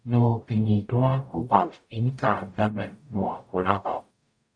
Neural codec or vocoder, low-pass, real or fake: codec, 44.1 kHz, 0.9 kbps, DAC; 9.9 kHz; fake